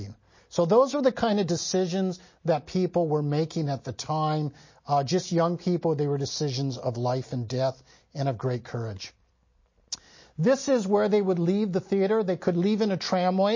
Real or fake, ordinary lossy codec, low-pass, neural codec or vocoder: real; MP3, 32 kbps; 7.2 kHz; none